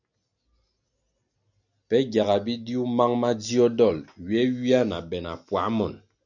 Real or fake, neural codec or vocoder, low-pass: real; none; 7.2 kHz